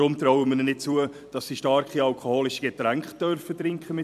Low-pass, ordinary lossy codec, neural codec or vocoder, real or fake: 14.4 kHz; MP3, 96 kbps; vocoder, 44.1 kHz, 128 mel bands every 256 samples, BigVGAN v2; fake